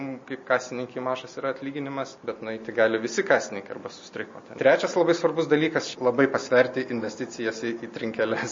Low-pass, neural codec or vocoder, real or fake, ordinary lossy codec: 7.2 kHz; none; real; MP3, 32 kbps